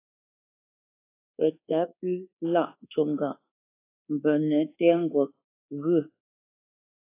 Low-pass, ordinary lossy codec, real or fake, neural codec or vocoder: 3.6 kHz; AAC, 24 kbps; fake; codec, 24 kHz, 1.2 kbps, DualCodec